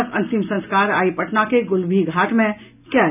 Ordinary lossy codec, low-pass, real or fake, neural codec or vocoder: none; 3.6 kHz; real; none